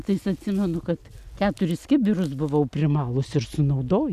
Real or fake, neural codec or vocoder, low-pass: real; none; 14.4 kHz